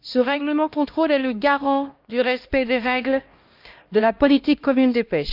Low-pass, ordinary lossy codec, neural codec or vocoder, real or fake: 5.4 kHz; Opus, 24 kbps; codec, 16 kHz, 1 kbps, X-Codec, HuBERT features, trained on LibriSpeech; fake